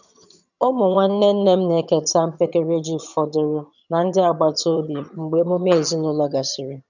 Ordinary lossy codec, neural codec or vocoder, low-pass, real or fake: none; vocoder, 22.05 kHz, 80 mel bands, HiFi-GAN; 7.2 kHz; fake